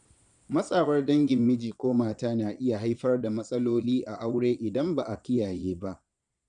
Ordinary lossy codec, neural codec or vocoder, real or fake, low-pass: none; vocoder, 22.05 kHz, 80 mel bands, Vocos; fake; 9.9 kHz